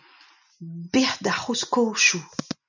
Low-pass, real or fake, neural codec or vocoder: 7.2 kHz; real; none